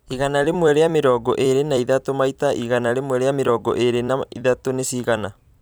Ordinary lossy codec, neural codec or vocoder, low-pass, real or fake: none; vocoder, 44.1 kHz, 128 mel bands every 256 samples, BigVGAN v2; none; fake